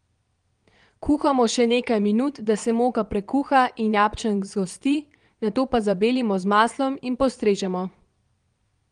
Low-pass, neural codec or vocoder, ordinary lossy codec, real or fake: 9.9 kHz; vocoder, 22.05 kHz, 80 mel bands, Vocos; Opus, 24 kbps; fake